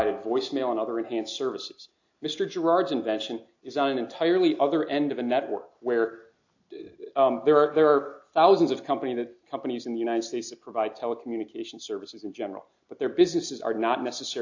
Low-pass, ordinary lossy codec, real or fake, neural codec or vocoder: 7.2 kHz; AAC, 48 kbps; real; none